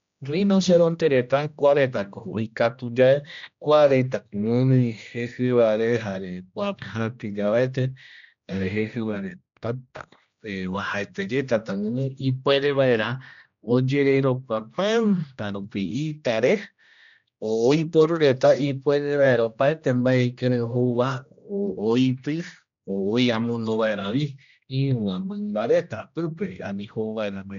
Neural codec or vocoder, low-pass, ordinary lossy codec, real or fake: codec, 16 kHz, 1 kbps, X-Codec, HuBERT features, trained on general audio; 7.2 kHz; MP3, 64 kbps; fake